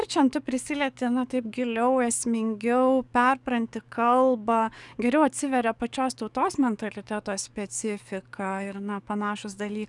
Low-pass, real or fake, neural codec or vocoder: 10.8 kHz; fake; codec, 44.1 kHz, 7.8 kbps, DAC